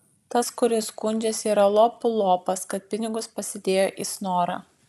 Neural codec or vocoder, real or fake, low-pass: none; real; 14.4 kHz